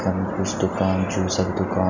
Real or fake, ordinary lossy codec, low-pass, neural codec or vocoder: real; none; 7.2 kHz; none